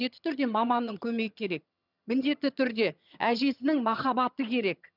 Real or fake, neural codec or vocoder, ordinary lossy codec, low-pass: fake; vocoder, 22.05 kHz, 80 mel bands, HiFi-GAN; none; 5.4 kHz